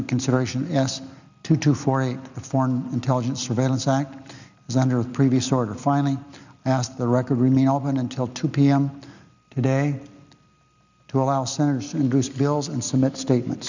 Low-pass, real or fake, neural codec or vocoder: 7.2 kHz; real; none